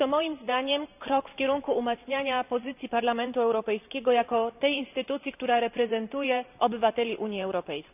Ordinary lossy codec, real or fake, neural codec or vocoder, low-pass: none; fake; vocoder, 44.1 kHz, 128 mel bands every 512 samples, BigVGAN v2; 3.6 kHz